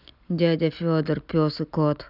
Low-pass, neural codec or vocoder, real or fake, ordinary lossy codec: 5.4 kHz; none; real; none